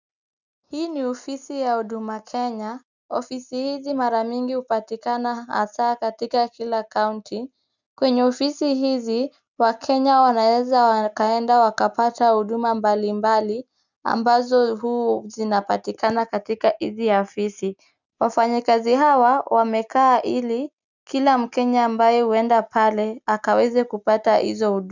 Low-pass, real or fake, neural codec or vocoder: 7.2 kHz; real; none